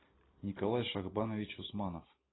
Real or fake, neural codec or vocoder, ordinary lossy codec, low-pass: real; none; AAC, 16 kbps; 7.2 kHz